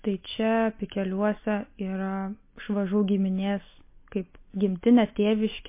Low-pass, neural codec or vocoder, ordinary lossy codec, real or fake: 3.6 kHz; none; MP3, 24 kbps; real